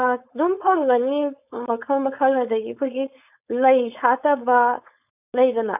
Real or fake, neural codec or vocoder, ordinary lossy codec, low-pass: fake; codec, 16 kHz, 4.8 kbps, FACodec; none; 3.6 kHz